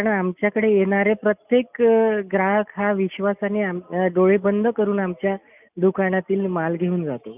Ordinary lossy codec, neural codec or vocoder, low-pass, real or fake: none; none; 3.6 kHz; real